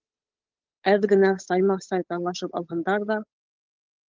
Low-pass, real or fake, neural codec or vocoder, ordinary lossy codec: 7.2 kHz; fake; codec, 16 kHz, 8 kbps, FunCodec, trained on Chinese and English, 25 frames a second; Opus, 24 kbps